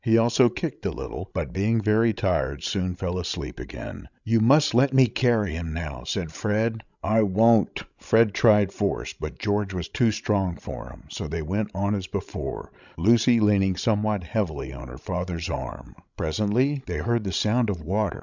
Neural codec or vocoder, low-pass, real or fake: codec, 16 kHz, 16 kbps, FreqCodec, larger model; 7.2 kHz; fake